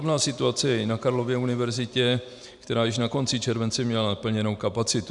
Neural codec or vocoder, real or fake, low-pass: vocoder, 44.1 kHz, 128 mel bands every 512 samples, BigVGAN v2; fake; 10.8 kHz